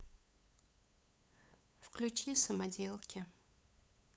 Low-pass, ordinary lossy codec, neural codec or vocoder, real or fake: none; none; codec, 16 kHz, 8 kbps, FunCodec, trained on LibriTTS, 25 frames a second; fake